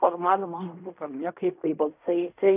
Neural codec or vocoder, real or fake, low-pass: codec, 16 kHz in and 24 kHz out, 0.4 kbps, LongCat-Audio-Codec, fine tuned four codebook decoder; fake; 3.6 kHz